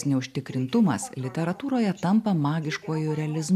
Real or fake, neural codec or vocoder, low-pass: real; none; 14.4 kHz